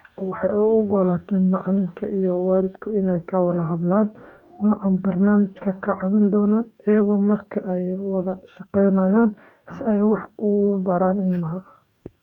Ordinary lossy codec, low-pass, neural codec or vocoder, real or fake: none; 19.8 kHz; codec, 44.1 kHz, 2.6 kbps, DAC; fake